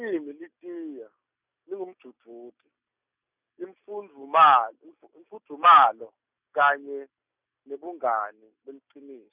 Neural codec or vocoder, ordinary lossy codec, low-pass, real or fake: none; none; 3.6 kHz; real